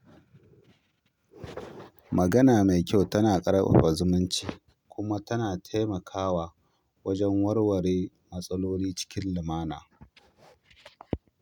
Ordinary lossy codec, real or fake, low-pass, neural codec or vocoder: none; real; 19.8 kHz; none